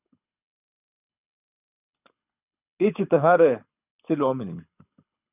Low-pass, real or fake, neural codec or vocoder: 3.6 kHz; fake; codec, 24 kHz, 6 kbps, HILCodec